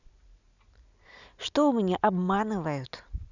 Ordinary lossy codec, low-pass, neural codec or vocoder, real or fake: none; 7.2 kHz; none; real